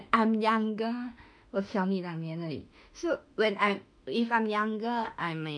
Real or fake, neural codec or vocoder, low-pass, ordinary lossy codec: fake; autoencoder, 48 kHz, 32 numbers a frame, DAC-VAE, trained on Japanese speech; 9.9 kHz; MP3, 96 kbps